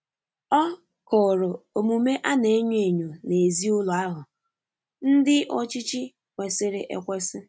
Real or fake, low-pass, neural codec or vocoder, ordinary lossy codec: real; none; none; none